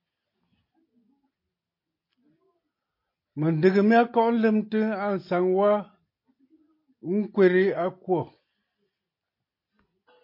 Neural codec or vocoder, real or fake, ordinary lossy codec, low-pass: none; real; MP3, 32 kbps; 5.4 kHz